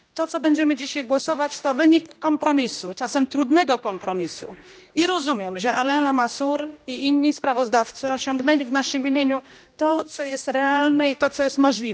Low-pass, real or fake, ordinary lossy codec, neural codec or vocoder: none; fake; none; codec, 16 kHz, 1 kbps, X-Codec, HuBERT features, trained on general audio